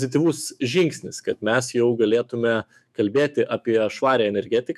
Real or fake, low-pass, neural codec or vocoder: fake; 14.4 kHz; autoencoder, 48 kHz, 128 numbers a frame, DAC-VAE, trained on Japanese speech